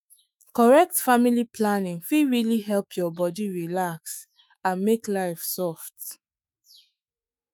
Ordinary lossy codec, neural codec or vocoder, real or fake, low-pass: none; autoencoder, 48 kHz, 128 numbers a frame, DAC-VAE, trained on Japanese speech; fake; none